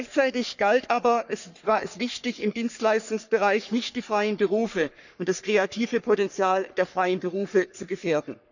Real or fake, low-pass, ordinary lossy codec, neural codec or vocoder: fake; 7.2 kHz; none; codec, 44.1 kHz, 3.4 kbps, Pupu-Codec